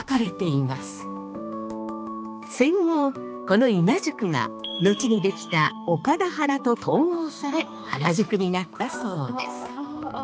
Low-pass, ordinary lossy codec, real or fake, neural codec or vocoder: none; none; fake; codec, 16 kHz, 2 kbps, X-Codec, HuBERT features, trained on balanced general audio